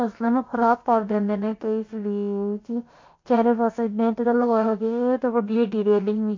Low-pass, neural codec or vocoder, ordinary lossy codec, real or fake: 7.2 kHz; codec, 16 kHz, about 1 kbps, DyCAST, with the encoder's durations; MP3, 48 kbps; fake